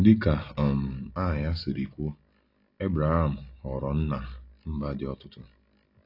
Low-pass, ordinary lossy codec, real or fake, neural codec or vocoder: 5.4 kHz; AAC, 32 kbps; real; none